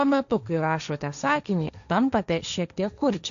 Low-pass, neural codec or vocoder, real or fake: 7.2 kHz; codec, 16 kHz, 1.1 kbps, Voila-Tokenizer; fake